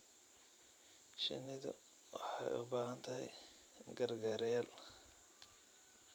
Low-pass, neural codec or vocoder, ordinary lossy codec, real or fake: 19.8 kHz; vocoder, 44.1 kHz, 128 mel bands every 512 samples, BigVGAN v2; none; fake